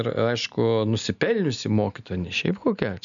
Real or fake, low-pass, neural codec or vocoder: real; 7.2 kHz; none